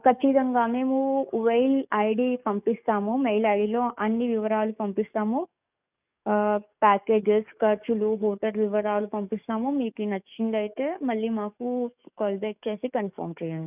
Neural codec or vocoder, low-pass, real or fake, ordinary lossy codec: codec, 44.1 kHz, 7.8 kbps, DAC; 3.6 kHz; fake; none